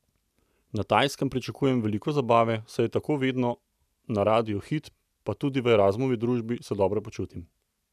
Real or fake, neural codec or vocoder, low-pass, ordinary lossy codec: real; none; 14.4 kHz; none